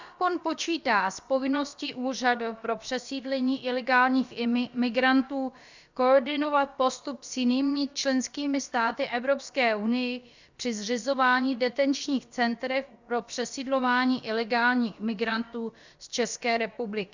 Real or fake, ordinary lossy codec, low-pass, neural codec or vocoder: fake; Opus, 64 kbps; 7.2 kHz; codec, 16 kHz, about 1 kbps, DyCAST, with the encoder's durations